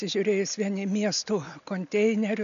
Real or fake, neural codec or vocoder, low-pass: real; none; 7.2 kHz